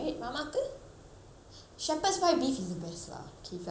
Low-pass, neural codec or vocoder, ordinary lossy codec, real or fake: none; none; none; real